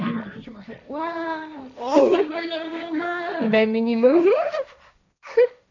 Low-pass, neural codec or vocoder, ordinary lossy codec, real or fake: 7.2 kHz; codec, 16 kHz, 1.1 kbps, Voila-Tokenizer; none; fake